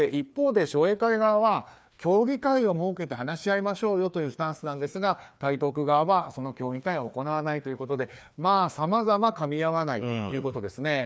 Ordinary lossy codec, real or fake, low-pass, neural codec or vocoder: none; fake; none; codec, 16 kHz, 2 kbps, FreqCodec, larger model